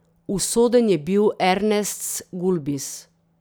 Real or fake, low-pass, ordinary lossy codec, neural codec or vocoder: real; none; none; none